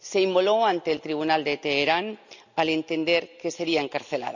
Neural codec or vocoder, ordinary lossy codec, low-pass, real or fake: none; none; 7.2 kHz; real